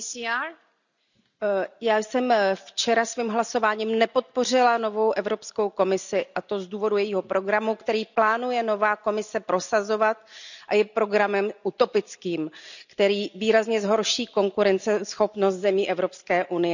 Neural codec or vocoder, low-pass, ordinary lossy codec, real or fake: none; 7.2 kHz; none; real